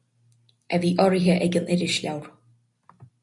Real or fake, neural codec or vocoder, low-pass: real; none; 10.8 kHz